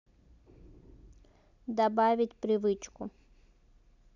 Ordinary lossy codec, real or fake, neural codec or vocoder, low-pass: none; real; none; 7.2 kHz